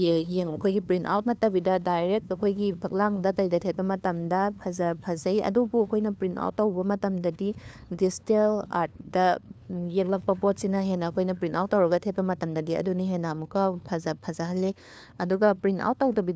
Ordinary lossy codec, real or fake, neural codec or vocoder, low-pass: none; fake; codec, 16 kHz, 2 kbps, FunCodec, trained on LibriTTS, 25 frames a second; none